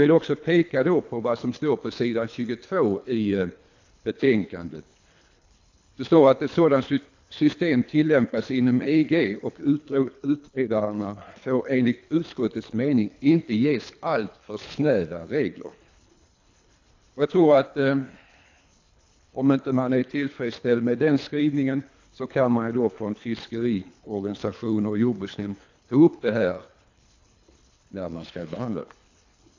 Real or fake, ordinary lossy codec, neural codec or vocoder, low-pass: fake; AAC, 48 kbps; codec, 24 kHz, 3 kbps, HILCodec; 7.2 kHz